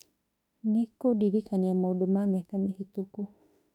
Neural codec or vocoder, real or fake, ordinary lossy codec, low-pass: autoencoder, 48 kHz, 32 numbers a frame, DAC-VAE, trained on Japanese speech; fake; none; 19.8 kHz